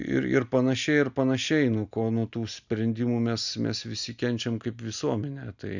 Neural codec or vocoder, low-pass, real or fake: none; 7.2 kHz; real